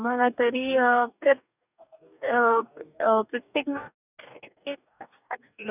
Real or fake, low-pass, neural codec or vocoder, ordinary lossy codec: fake; 3.6 kHz; codec, 44.1 kHz, 2.6 kbps, DAC; none